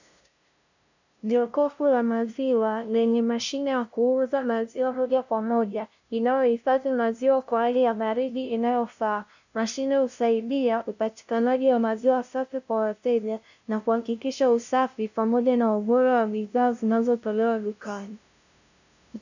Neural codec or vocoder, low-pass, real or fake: codec, 16 kHz, 0.5 kbps, FunCodec, trained on LibriTTS, 25 frames a second; 7.2 kHz; fake